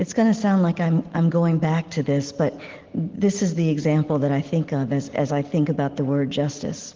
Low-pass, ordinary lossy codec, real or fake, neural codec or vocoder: 7.2 kHz; Opus, 16 kbps; real; none